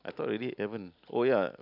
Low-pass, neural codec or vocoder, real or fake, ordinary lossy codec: 5.4 kHz; none; real; none